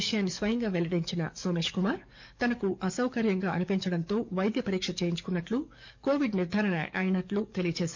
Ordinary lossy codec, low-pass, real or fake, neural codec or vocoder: MP3, 64 kbps; 7.2 kHz; fake; codec, 44.1 kHz, 7.8 kbps, DAC